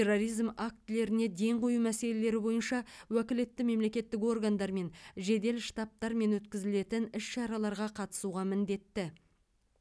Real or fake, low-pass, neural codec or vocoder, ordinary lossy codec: real; none; none; none